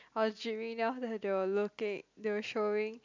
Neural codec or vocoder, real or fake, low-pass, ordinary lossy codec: none; real; 7.2 kHz; MP3, 64 kbps